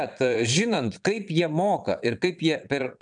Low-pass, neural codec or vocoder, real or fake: 9.9 kHz; vocoder, 22.05 kHz, 80 mel bands, Vocos; fake